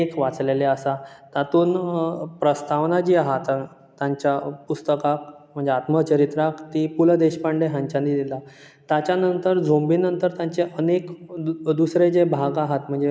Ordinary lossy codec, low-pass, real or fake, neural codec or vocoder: none; none; real; none